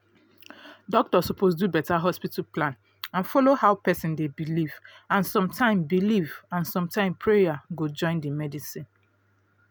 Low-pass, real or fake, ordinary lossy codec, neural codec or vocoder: none; real; none; none